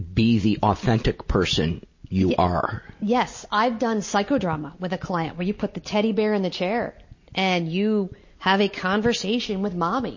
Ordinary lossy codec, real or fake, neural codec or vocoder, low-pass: MP3, 32 kbps; real; none; 7.2 kHz